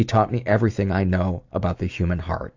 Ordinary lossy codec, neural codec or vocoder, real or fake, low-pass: AAC, 48 kbps; none; real; 7.2 kHz